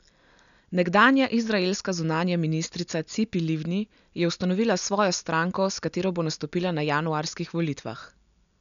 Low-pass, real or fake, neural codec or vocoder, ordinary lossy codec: 7.2 kHz; real; none; none